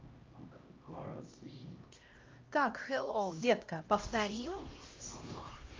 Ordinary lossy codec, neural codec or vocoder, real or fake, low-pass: Opus, 24 kbps; codec, 16 kHz, 1 kbps, X-Codec, HuBERT features, trained on LibriSpeech; fake; 7.2 kHz